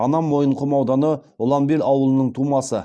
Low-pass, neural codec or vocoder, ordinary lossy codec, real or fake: none; none; none; real